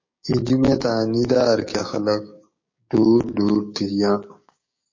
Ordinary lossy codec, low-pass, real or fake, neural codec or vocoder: MP3, 32 kbps; 7.2 kHz; fake; codec, 16 kHz, 6 kbps, DAC